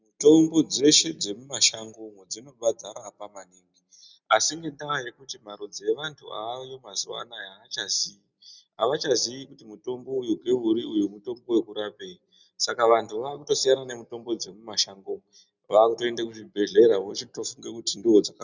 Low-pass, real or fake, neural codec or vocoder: 7.2 kHz; real; none